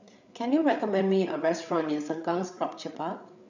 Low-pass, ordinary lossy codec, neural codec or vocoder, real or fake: 7.2 kHz; none; codec, 16 kHz, 8 kbps, FreqCodec, larger model; fake